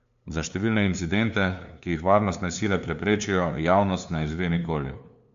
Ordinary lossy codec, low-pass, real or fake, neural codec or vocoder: MP3, 64 kbps; 7.2 kHz; fake; codec, 16 kHz, 2 kbps, FunCodec, trained on LibriTTS, 25 frames a second